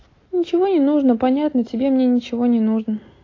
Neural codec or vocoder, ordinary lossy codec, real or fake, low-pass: none; AAC, 32 kbps; real; 7.2 kHz